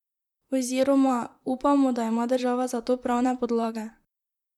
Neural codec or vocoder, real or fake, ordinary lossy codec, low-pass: vocoder, 44.1 kHz, 128 mel bands, Pupu-Vocoder; fake; none; 19.8 kHz